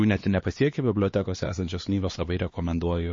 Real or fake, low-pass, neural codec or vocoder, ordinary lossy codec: fake; 7.2 kHz; codec, 16 kHz, 2 kbps, X-Codec, HuBERT features, trained on LibriSpeech; MP3, 32 kbps